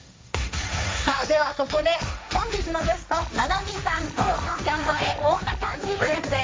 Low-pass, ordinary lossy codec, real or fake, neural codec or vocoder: none; none; fake; codec, 16 kHz, 1.1 kbps, Voila-Tokenizer